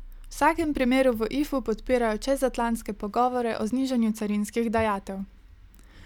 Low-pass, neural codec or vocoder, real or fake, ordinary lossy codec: 19.8 kHz; none; real; none